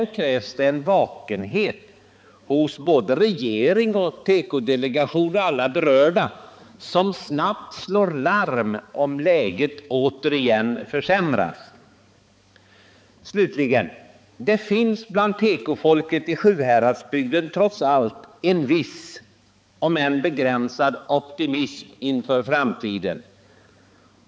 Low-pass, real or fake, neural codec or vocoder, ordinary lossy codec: none; fake; codec, 16 kHz, 4 kbps, X-Codec, HuBERT features, trained on balanced general audio; none